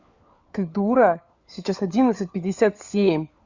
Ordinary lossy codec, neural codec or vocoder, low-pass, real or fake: Opus, 64 kbps; codec, 16 kHz, 4 kbps, FunCodec, trained on LibriTTS, 50 frames a second; 7.2 kHz; fake